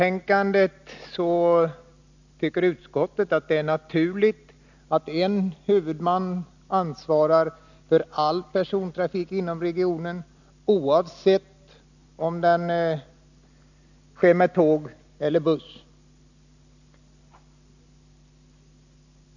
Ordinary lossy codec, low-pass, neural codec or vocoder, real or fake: none; 7.2 kHz; none; real